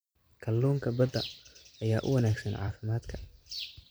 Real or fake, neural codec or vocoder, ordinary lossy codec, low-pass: fake; vocoder, 44.1 kHz, 128 mel bands every 256 samples, BigVGAN v2; none; none